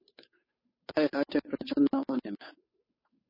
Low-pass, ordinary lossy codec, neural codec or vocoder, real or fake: 5.4 kHz; MP3, 24 kbps; codec, 16 kHz, 8 kbps, FunCodec, trained on Chinese and English, 25 frames a second; fake